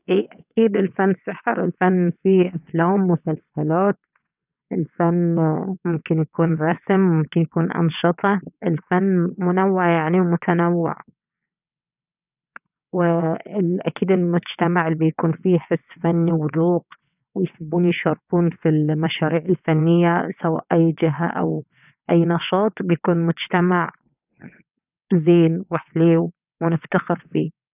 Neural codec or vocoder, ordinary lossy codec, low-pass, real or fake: vocoder, 22.05 kHz, 80 mel bands, WaveNeXt; none; 3.6 kHz; fake